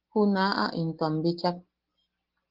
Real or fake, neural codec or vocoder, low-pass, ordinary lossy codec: real; none; 5.4 kHz; Opus, 16 kbps